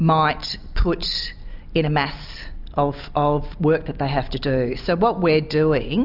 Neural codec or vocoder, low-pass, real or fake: none; 5.4 kHz; real